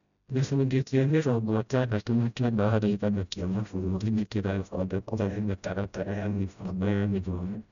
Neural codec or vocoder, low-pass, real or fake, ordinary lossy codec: codec, 16 kHz, 0.5 kbps, FreqCodec, smaller model; 7.2 kHz; fake; none